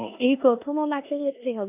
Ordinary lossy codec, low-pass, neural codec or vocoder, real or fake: AAC, 32 kbps; 3.6 kHz; codec, 16 kHz in and 24 kHz out, 0.9 kbps, LongCat-Audio-Codec, four codebook decoder; fake